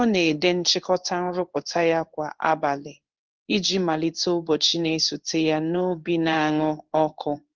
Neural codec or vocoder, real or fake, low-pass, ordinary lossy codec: codec, 16 kHz in and 24 kHz out, 1 kbps, XY-Tokenizer; fake; 7.2 kHz; Opus, 24 kbps